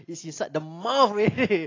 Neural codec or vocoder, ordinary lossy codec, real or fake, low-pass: none; AAC, 32 kbps; real; 7.2 kHz